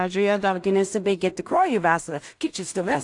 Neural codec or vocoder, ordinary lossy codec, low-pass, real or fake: codec, 16 kHz in and 24 kHz out, 0.4 kbps, LongCat-Audio-Codec, two codebook decoder; AAC, 64 kbps; 10.8 kHz; fake